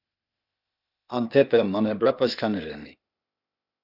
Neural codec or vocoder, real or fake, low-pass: codec, 16 kHz, 0.8 kbps, ZipCodec; fake; 5.4 kHz